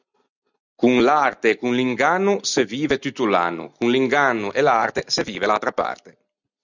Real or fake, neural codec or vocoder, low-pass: real; none; 7.2 kHz